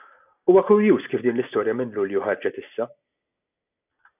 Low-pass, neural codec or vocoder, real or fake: 3.6 kHz; none; real